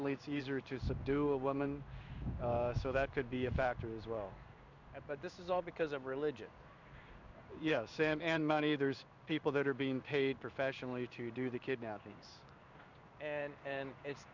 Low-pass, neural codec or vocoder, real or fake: 7.2 kHz; codec, 16 kHz in and 24 kHz out, 1 kbps, XY-Tokenizer; fake